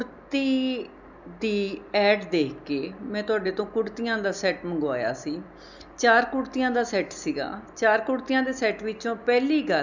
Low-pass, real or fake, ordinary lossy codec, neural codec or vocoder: 7.2 kHz; real; none; none